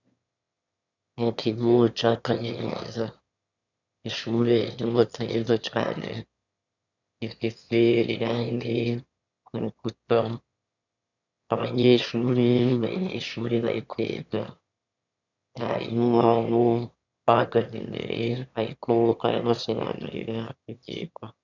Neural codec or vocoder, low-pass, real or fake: autoencoder, 22.05 kHz, a latent of 192 numbers a frame, VITS, trained on one speaker; 7.2 kHz; fake